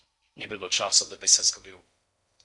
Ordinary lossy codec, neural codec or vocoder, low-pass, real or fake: MP3, 96 kbps; codec, 16 kHz in and 24 kHz out, 0.6 kbps, FocalCodec, streaming, 4096 codes; 10.8 kHz; fake